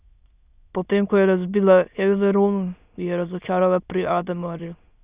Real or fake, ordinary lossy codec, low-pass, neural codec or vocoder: fake; Opus, 64 kbps; 3.6 kHz; autoencoder, 22.05 kHz, a latent of 192 numbers a frame, VITS, trained on many speakers